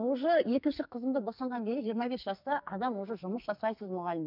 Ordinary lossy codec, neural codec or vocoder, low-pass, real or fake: none; codec, 44.1 kHz, 2.6 kbps, SNAC; 5.4 kHz; fake